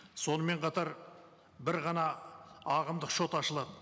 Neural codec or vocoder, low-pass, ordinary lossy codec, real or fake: none; none; none; real